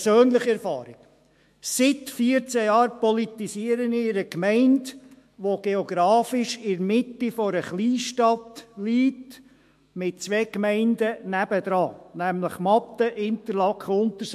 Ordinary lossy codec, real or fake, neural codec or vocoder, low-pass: MP3, 64 kbps; fake; autoencoder, 48 kHz, 128 numbers a frame, DAC-VAE, trained on Japanese speech; 14.4 kHz